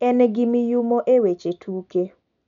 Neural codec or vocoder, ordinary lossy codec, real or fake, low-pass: none; none; real; 7.2 kHz